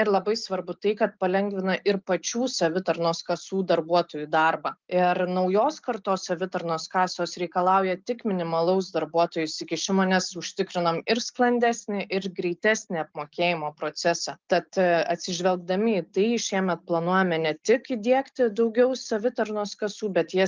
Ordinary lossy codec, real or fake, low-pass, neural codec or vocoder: Opus, 24 kbps; real; 7.2 kHz; none